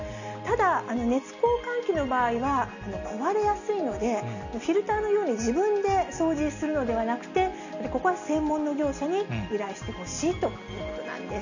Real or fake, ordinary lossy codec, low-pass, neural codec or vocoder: real; none; 7.2 kHz; none